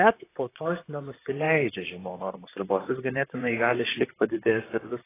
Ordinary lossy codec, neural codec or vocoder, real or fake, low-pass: AAC, 16 kbps; vocoder, 44.1 kHz, 128 mel bands, Pupu-Vocoder; fake; 3.6 kHz